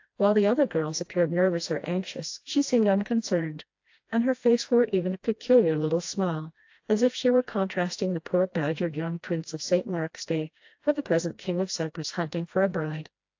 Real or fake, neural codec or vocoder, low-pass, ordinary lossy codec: fake; codec, 16 kHz, 1 kbps, FreqCodec, smaller model; 7.2 kHz; AAC, 48 kbps